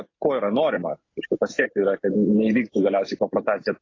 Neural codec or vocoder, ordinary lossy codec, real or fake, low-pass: none; AAC, 32 kbps; real; 7.2 kHz